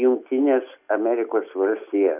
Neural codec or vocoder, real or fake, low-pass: none; real; 3.6 kHz